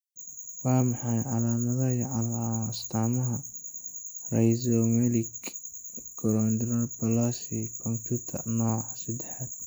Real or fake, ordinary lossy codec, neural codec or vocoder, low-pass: real; none; none; none